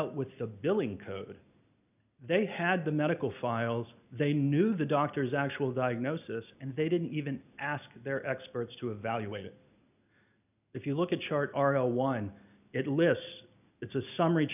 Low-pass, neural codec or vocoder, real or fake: 3.6 kHz; none; real